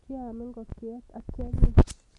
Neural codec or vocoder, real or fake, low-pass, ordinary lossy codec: none; real; 10.8 kHz; none